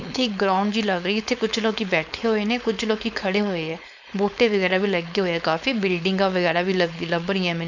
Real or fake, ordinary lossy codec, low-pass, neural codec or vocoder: fake; none; 7.2 kHz; codec, 16 kHz, 4.8 kbps, FACodec